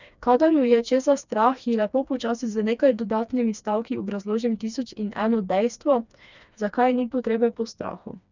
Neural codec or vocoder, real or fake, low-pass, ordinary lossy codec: codec, 16 kHz, 2 kbps, FreqCodec, smaller model; fake; 7.2 kHz; none